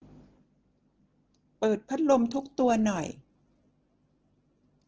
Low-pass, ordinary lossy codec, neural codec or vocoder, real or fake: 7.2 kHz; Opus, 16 kbps; none; real